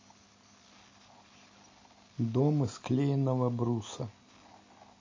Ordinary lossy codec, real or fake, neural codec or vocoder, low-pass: MP3, 32 kbps; real; none; 7.2 kHz